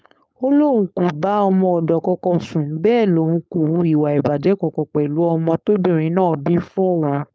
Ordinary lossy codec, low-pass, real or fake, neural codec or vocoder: none; none; fake; codec, 16 kHz, 4.8 kbps, FACodec